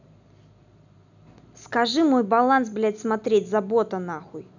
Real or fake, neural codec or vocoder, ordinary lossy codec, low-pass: real; none; none; 7.2 kHz